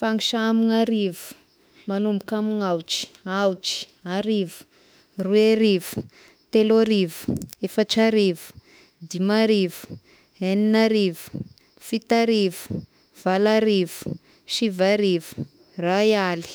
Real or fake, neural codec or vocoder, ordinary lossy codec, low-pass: fake; autoencoder, 48 kHz, 32 numbers a frame, DAC-VAE, trained on Japanese speech; none; none